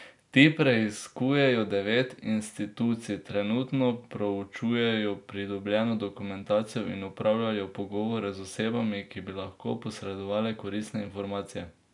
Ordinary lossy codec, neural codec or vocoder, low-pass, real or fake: none; none; 10.8 kHz; real